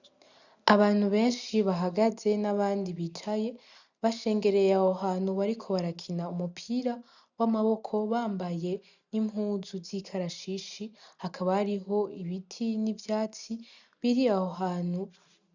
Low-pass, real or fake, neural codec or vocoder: 7.2 kHz; real; none